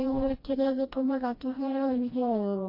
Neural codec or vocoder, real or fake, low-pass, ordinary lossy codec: codec, 16 kHz, 1 kbps, FreqCodec, smaller model; fake; 5.4 kHz; MP3, 32 kbps